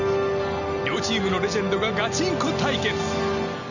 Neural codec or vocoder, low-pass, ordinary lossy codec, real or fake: none; 7.2 kHz; none; real